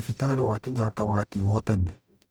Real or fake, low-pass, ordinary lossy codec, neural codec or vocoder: fake; none; none; codec, 44.1 kHz, 0.9 kbps, DAC